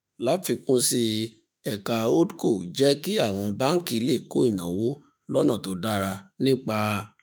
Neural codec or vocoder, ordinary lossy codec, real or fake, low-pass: autoencoder, 48 kHz, 32 numbers a frame, DAC-VAE, trained on Japanese speech; none; fake; none